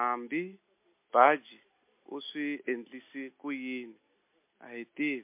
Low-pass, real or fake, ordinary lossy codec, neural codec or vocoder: 3.6 kHz; real; MP3, 24 kbps; none